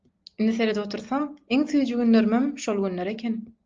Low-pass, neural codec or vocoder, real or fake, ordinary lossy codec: 7.2 kHz; none; real; Opus, 24 kbps